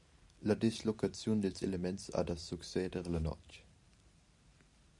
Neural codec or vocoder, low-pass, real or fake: vocoder, 44.1 kHz, 128 mel bands every 512 samples, BigVGAN v2; 10.8 kHz; fake